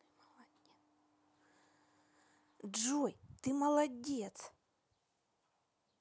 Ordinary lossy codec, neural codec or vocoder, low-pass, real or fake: none; none; none; real